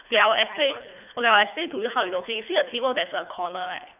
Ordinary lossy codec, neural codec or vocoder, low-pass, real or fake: none; codec, 24 kHz, 3 kbps, HILCodec; 3.6 kHz; fake